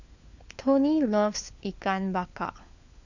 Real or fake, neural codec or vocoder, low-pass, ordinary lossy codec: fake; codec, 16 kHz, 4 kbps, FunCodec, trained on LibriTTS, 50 frames a second; 7.2 kHz; none